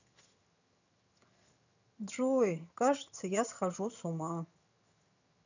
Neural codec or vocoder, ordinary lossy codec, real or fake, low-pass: vocoder, 22.05 kHz, 80 mel bands, HiFi-GAN; none; fake; 7.2 kHz